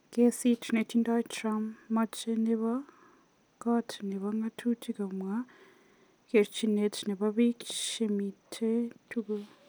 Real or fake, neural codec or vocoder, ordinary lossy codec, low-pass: real; none; none; none